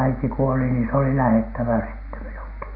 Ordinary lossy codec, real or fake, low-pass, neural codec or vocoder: AAC, 48 kbps; real; 5.4 kHz; none